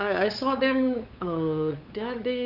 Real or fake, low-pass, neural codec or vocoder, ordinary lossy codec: fake; 5.4 kHz; codec, 16 kHz, 8 kbps, FunCodec, trained on LibriTTS, 25 frames a second; none